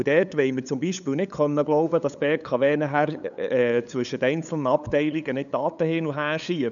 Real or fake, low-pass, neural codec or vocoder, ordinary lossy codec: fake; 7.2 kHz; codec, 16 kHz, 8 kbps, FunCodec, trained on LibriTTS, 25 frames a second; none